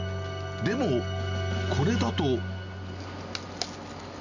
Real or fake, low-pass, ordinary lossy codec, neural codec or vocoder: real; 7.2 kHz; none; none